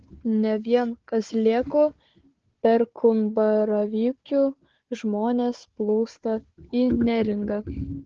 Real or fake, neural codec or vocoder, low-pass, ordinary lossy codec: fake; codec, 16 kHz, 4 kbps, FunCodec, trained on Chinese and English, 50 frames a second; 7.2 kHz; Opus, 16 kbps